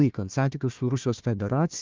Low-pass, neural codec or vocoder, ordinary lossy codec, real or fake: 7.2 kHz; codec, 16 kHz, 1 kbps, FunCodec, trained on Chinese and English, 50 frames a second; Opus, 32 kbps; fake